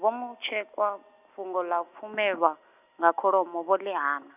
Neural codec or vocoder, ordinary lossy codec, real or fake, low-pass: none; none; real; 3.6 kHz